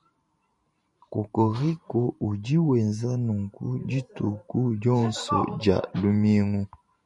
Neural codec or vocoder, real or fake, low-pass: vocoder, 44.1 kHz, 128 mel bands every 512 samples, BigVGAN v2; fake; 10.8 kHz